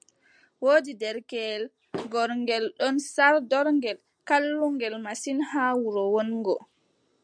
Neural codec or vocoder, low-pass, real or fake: none; 9.9 kHz; real